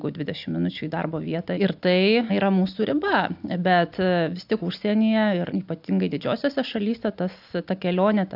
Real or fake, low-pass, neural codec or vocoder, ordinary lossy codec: real; 5.4 kHz; none; AAC, 48 kbps